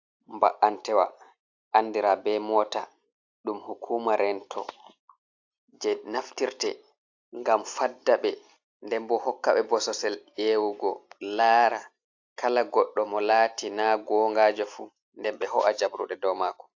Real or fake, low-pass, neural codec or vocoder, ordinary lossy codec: real; 7.2 kHz; none; AAC, 48 kbps